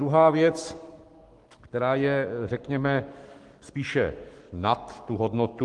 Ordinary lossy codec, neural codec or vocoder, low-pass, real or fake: Opus, 24 kbps; codec, 44.1 kHz, 7.8 kbps, Pupu-Codec; 10.8 kHz; fake